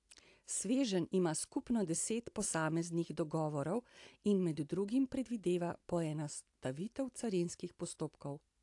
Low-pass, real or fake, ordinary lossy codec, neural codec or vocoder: 10.8 kHz; real; AAC, 64 kbps; none